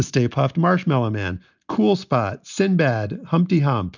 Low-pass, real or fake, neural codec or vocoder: 7.2 kHz; real; none